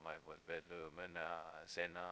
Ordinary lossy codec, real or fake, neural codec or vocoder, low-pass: none; fake; codec, 16 kHz, 0.2 kbps, FocalCodec; none